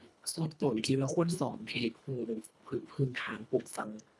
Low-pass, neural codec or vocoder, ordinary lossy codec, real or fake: none; codec, 24 kHz, 1.5 kbps, HILCodec; none; fake